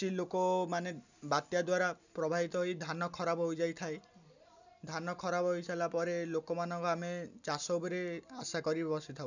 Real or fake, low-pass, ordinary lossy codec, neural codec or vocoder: real; 7.2 kHz; none; none